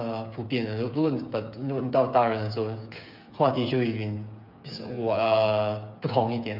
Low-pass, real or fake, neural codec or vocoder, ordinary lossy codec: 5.4 kHz; fake; codec, 16 kHz, 8 kbps, FreqCodec, smaller model; MP3, 48 kbps